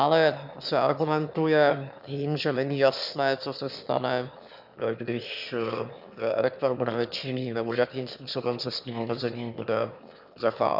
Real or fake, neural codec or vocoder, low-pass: fake; autoencoder, 22.05 kHz, a latent of 192 numbers a frame, VITS, trained on one speaker; 5.4 kHz